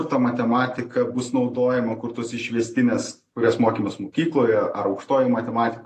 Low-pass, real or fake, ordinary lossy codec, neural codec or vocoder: 14.4 kHz; real; AAC, 48 kbps; none